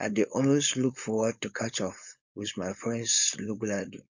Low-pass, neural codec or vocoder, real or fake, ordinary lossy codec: 7.2 kHz; codec, 16 kHz, 4.8 kbps, FACodec; fake; none